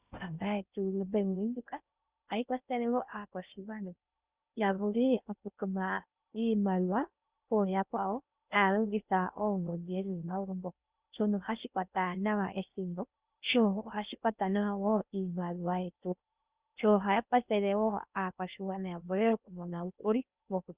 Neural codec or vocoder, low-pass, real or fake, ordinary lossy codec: codec, 16 kHz in and 24 kHz out, 0.6 kbps, FocalCodec, streaming, 2048 codes; 3.6 kHz; fake; Opus, 64 kbps